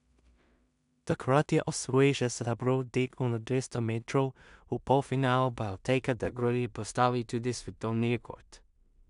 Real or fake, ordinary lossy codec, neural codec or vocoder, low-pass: fake; none; codec, 16 kHz in and 24 kHz out, 0.4 kbps, LongCat-Audio-Codec, two codebook decoder; 10.8 kHz